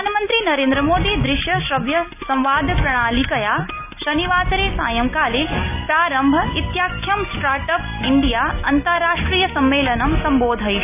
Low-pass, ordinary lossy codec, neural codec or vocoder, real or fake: 3.6 kHz; none; none; real